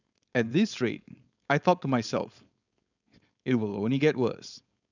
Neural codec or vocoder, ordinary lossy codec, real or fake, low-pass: codec, 16 kHz, 4.8 kbps, FACodec; none; fake; 7.2 kHz